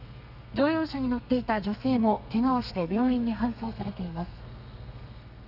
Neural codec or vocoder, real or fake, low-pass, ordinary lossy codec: codec, 32 kHz, 1.9 kbps, SNAC; fake; 5.4 kHz; none